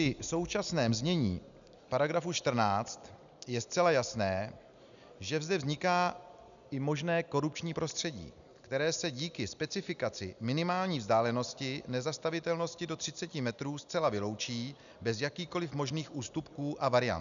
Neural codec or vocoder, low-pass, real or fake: none; 7.2 kHz; real